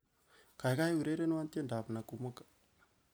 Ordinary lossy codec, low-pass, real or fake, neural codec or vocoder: none; none; real; none